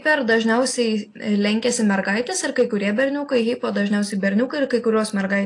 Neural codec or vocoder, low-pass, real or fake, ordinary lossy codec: none; 10.8 kHz; real; AAC, 48 kbps